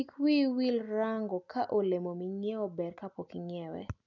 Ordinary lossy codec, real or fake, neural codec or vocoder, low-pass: none; real; none; 7.2 kHz